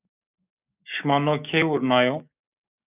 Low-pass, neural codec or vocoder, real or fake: 3.6 kHz; codec, 16 kHz, 6 kbps, DAC; fake